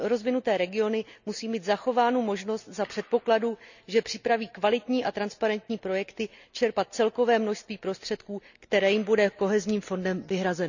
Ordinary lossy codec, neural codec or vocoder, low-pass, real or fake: none; none; 7.2 kHz; real